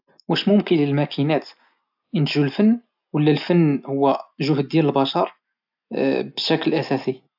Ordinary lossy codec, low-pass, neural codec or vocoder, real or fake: AAC, 48 kbps; 5.4 kHz; none; real